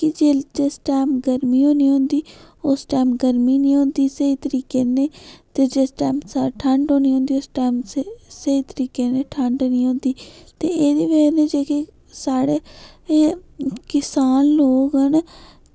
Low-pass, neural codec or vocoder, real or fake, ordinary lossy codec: none; none; real; none